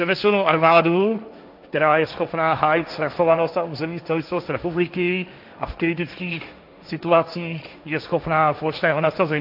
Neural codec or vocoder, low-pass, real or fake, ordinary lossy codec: codec, 16 kHz, 1.1 kbps, Voila-Tokenizer; 5.4 kHz; fake; AAC, 48 kbps